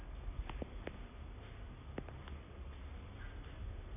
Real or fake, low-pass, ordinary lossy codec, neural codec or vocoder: real; 3.6 kHz; none; none